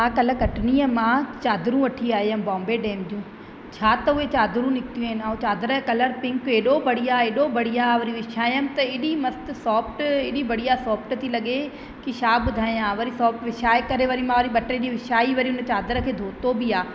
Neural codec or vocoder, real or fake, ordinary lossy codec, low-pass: none; real; none; none